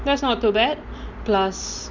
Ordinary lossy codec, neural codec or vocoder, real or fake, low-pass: Opus, 64 kbps; none; real; 7.2 kHz